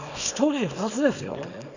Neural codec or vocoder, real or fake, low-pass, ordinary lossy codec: codec, 24 kHz, 0.9 kbps, WavTokenizer, small release; fake; 7.2 kHz; none